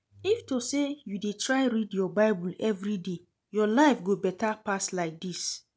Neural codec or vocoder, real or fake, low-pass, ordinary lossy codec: none; real; none; none